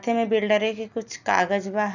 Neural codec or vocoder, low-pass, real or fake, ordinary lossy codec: none; 7.2 kHz; real; none